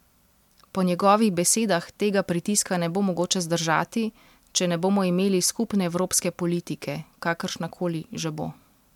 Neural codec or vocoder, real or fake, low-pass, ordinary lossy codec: none; real; 19.8 kHz; MP3, 96 kbps